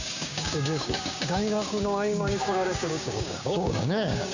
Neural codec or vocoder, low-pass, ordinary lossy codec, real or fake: codec, 16 kHz, 6 kbps, DAC; 7.2 kHz; none; fake